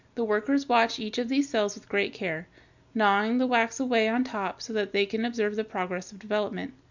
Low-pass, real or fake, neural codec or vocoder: 7.2 kHz; real; none